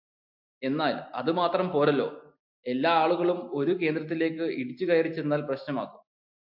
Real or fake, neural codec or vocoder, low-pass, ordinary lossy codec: real; none; 5.4 kHz; AAC, 48 kbps